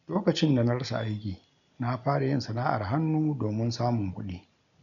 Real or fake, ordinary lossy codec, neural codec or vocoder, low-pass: real; none; none; 7.2 kHz